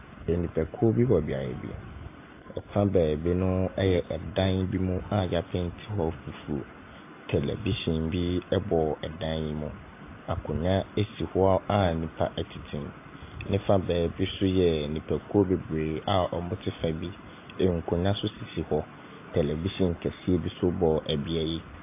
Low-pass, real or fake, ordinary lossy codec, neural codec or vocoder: 3.6 kHz; real; AAC, 24 kbps; none